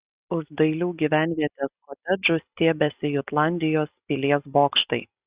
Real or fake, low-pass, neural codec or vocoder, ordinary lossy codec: real; 3.6 kHz; none; Opus, 64 kbps